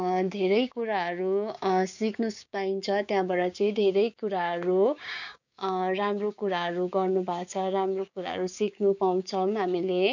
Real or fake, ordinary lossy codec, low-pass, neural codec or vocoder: fake; none; 7.2 kHz; codec, 16 kHz, 6 kbps, DAC